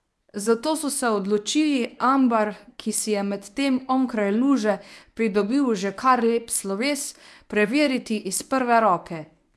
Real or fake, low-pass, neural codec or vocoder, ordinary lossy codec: fake; none; codec, 24 kHz, 0.9 kbps, WavTokenizer, medium speech release version 1; none